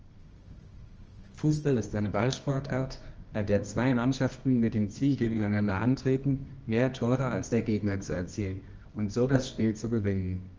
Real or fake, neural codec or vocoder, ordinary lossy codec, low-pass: fake; codec, 24 kHz, 0.9 kbps, WavTokenizer, medium music audio release; Opus, 24 kbps; 7.2 kHz